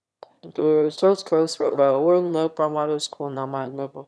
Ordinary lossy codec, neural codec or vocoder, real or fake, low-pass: none; autoencoder, 22.05 kHz, a latent of 192 numbers a frame, VITS, trained on one speaker; fake; none